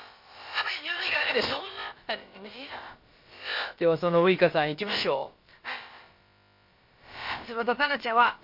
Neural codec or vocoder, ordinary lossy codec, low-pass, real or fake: codec, 16 kHz, about 1 kbps, DyCAST, with the encoder's durations; MP3, 32 kbps; 5.4 kHz; fake